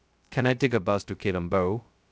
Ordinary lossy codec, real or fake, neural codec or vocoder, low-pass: none; fake; codec, 16 kHz, 0.2 kbps, FocalCodec; none